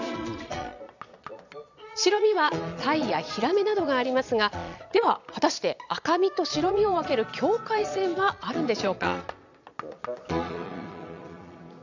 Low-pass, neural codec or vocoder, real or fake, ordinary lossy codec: 7.2 kHz; vocoder, 22.05 kHz, 80 mel bands, Vocos; fake; none